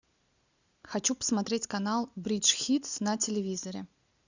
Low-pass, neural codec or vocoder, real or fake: 7.2 kHz; none; real